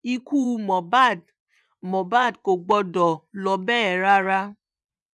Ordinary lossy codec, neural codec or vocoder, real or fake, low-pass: none; vocoder, 24 kHz, 100 mel bands, Vocos; fake; none